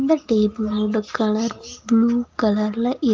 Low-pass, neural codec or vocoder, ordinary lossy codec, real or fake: 7.2 kHz; none; Opus, 32 kbps; real